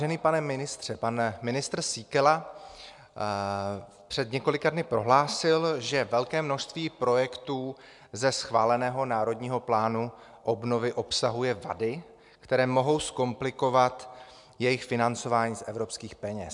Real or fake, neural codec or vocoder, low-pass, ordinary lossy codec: real; none; 10.8 kHz; MP3, 96 kbps